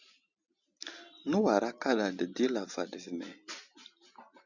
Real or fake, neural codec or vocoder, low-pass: real; none; 7.2 kHz